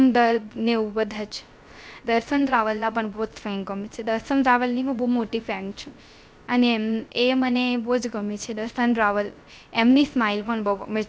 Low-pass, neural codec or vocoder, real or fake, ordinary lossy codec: none; codec, 16 kHz, 0.3 kbps, FocalCodec; fake; none